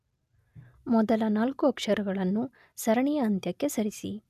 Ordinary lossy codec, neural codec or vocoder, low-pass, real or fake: none; none; 14.4 kHz; real